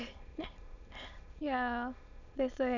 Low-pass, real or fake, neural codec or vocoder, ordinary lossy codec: 7.2 kHz; fake; autoencoder, 22.05 kHz, a latent of 192 numbers a frame, VITS, trained on many speakers; none